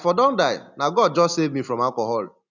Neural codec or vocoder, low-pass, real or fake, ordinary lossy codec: none; 7.2 kHz; real; none